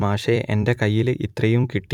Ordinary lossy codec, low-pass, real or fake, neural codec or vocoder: none; 19.8 kHz; fake; vocoder, 44.1 kHz, 128 mel bands every 256 samples, BigVGAN v2